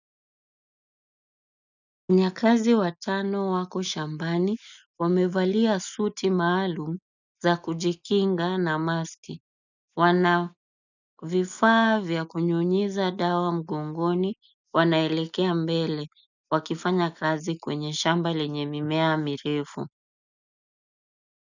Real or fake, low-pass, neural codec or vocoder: real; 7.2 kHz; none